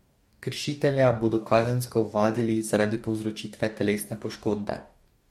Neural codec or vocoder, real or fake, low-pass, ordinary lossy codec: codec, 44.1 kHz, 2.6 kbps, DAC; fake; 19.8 kHz; MP3, 64 kbps